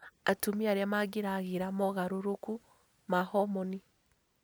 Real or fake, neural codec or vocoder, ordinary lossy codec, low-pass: real; none; none; none